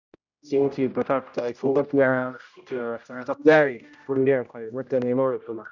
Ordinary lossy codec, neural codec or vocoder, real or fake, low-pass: none; codec, 16 kHz, 0.5 kbps, X-Codec, HuBERT features, trained on general audio; fake; 7.2 kHz